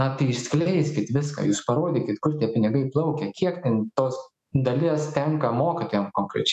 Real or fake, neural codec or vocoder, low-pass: fake; autoencoder, 48 kHz, 128 numbers a frame, DAC-VAE, trained on Japanese speech; 14.4 kHz